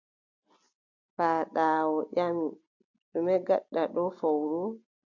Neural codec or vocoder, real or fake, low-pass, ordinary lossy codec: none; real; 7.2 kHz; AAC, 48 kbps